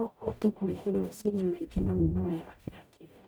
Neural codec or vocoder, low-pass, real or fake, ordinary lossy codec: codec, 44.1 kHz, 0.9 kbps, DAC; none; fake; none